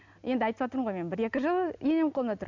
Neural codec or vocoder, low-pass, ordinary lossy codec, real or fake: none; 7.2 kHz; MP3, 64 kbps; real